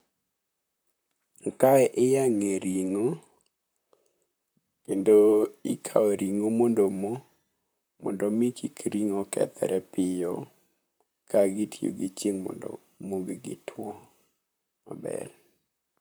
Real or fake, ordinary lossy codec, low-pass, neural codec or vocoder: fake; none; none; vocoder, 44.1 kHz, 128 mel bands, Pupu-Vocoder